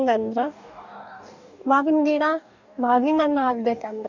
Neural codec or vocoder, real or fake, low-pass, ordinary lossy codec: codec, 44.1 kHz, 2.6 kbps, DAC; fake; 7.2 kHz; none